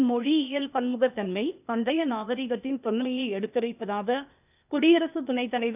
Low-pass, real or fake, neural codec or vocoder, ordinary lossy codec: 3.6 kHz; fake; codec, 16 kHz, 0.8 kbps, ZipCodec; none